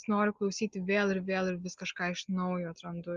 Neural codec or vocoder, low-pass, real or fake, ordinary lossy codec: none; 7.2 kHz; real; Opus, 32 kbps